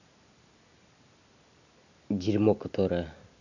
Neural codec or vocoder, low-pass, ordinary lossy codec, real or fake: none; 7.2 kHz; none; real